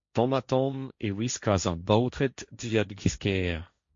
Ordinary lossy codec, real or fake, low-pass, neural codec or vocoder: MP3, 48 kbps; fake; 7.2 kHz; codec, 16 kHz, 1.1 kbps, Voila-Tokenizer